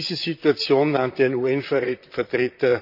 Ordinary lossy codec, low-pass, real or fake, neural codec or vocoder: none; 5.4 kHz; fake; vocoder, 44.1 kHz, 128 mel bands, Pupu-Vocoder